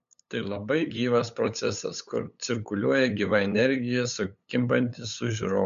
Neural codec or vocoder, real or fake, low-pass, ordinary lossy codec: codec, 16 kHz, 8 kbps, FunCodec, trained on LibriTTS, 25 frames a second; fake; 7.2 kHz; MP3, 64 kbps